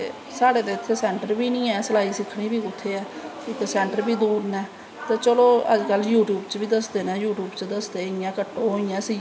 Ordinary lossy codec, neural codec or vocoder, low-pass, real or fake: none; none; none; real